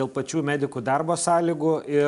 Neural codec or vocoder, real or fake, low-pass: none; real; 10.8 kHz